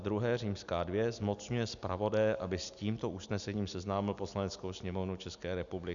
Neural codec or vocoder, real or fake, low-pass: none; real; 7.2 kHz